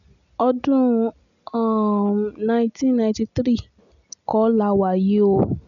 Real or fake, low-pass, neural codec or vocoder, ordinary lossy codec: real; 7.2 kHz; none; none